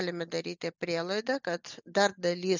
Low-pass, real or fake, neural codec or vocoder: 7.2 kHz; real; none